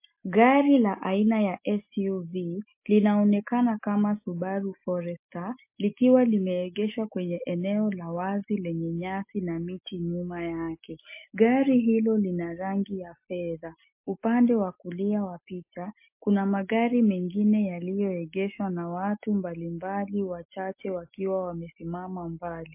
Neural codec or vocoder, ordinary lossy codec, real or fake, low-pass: none; MP3, 24 kbps; real; 3.6 kHz